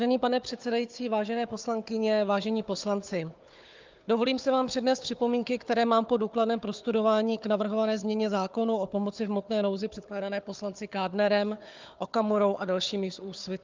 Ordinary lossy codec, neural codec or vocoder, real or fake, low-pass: Opus, 24 kbps; codec, 16 kHz, 4 kbps, FunCodec, trained on Chinese and English, 50 frames a second; fake; 7.2 kHz